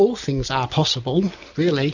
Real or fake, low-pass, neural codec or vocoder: real; 7.2 kHz; none